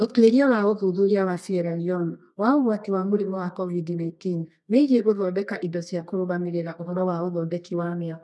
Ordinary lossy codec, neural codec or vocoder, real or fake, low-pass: none; codec, 24 kHz, 0.9 kbps, WavTokenizer, medium music audio release; fake; none